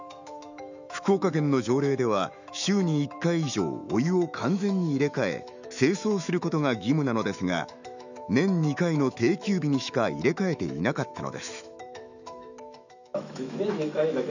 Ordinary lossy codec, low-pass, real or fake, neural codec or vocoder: none; 7.2 kHz; fake; autoencoder, 48 kHz, 128 numbers a frame, DAC-VAE, trained on Japanese speech